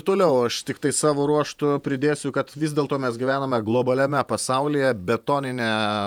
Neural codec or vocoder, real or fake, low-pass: vocoder, 48 kHz, 128 mel bands, Vocos; fake; 19.8 kHz